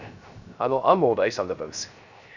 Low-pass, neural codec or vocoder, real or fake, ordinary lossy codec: 7.2 kHz; codec, 16 kHz, 0.3 kbps, FocalCodec; fake; none